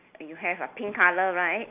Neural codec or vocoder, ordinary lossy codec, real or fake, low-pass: none; AAC, 32 kbps; real; 3.6 kHz